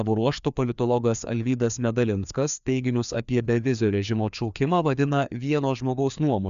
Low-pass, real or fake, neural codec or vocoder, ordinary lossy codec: 7.2 kHz; fake; codec, 16 kHz, 2 kbps, FreqCodec, larger model; MP3, 96 kbps